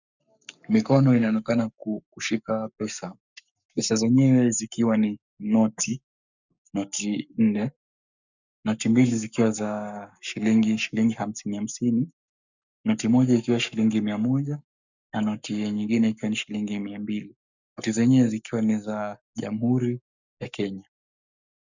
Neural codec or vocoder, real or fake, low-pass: codec, 44.1 kHz, 7.8 kbps, Pupu-Codec; fake; 7.2 kHz